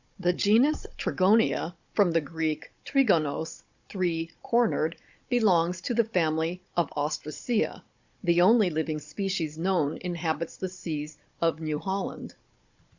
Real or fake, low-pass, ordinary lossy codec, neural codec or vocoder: fake; 7.2 kHz; Opus, 64 kbps; codec, 16 kHz, 16 kbps, FunCodec, trained on Chinese and English, 50 frames a second